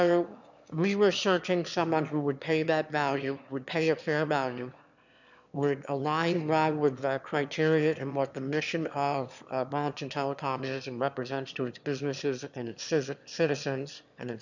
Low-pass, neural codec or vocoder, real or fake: 7.2 kHz; autoencoder, 22.05 kHz, a latent of 192 numbers a frame, VITS, trained on one speaker; fake